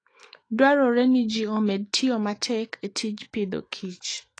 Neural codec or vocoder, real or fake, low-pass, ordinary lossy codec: autoencoder, 48 kHz, 128 numbers a frame, DAC-VAE, trained on Japanese speech; fake; 9.9 kHz; AAC, 32 kbps